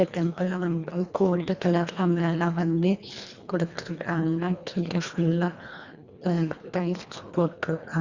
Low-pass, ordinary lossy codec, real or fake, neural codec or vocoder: 7.2 kHz; Opus, 64 kbps; fake; codec, 24 kHz, 1.5 kbps, HILCodec